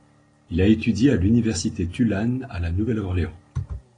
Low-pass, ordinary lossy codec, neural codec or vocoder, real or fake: 9.9 kHz; AAC, 32 kbps; none; real